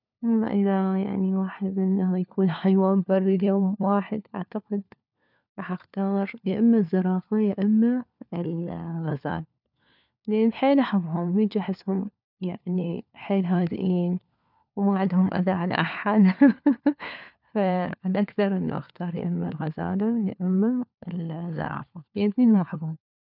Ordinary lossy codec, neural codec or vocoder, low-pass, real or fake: none; codec, 16 kHz, 4 kbps, FunCodec, trained on LibriTTS, 50 frames a second; 5.4 kHz; fake